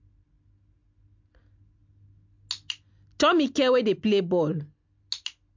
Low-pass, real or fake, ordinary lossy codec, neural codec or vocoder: 7.2 kHz; real; MP3, 64 kbps; none